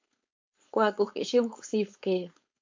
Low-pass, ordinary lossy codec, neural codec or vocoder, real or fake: 7.2 kHz; MP3, 48 kbps; codec, 16 kHz, 4.8 kbps, FACodec; fake